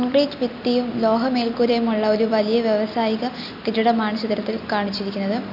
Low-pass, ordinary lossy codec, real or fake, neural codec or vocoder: 5.4 kHz; none; real; none